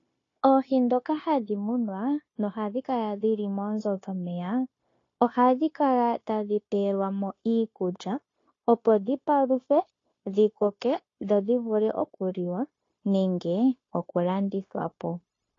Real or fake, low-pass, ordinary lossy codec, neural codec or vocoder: fake; 7.2 kHz; AAC, 32 kbps; codec, 16 kHz, 0.9 kbps, LongCat-Audio-Codec